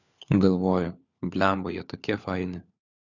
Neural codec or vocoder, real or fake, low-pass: codec, 16 kHz, 4 kbps, FunCodec, trained on LibriTTS, 50 frames a second; fake; 7.2 kHz